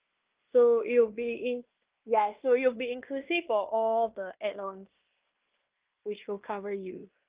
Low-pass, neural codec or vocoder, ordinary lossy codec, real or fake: 3.6 kHz; codec, 16 kHz in and 24 kHz out, 0.9 kbps, LongCat-Audio-Codec, fine tuned four codebook decoder; Opus, 32 kbps; fake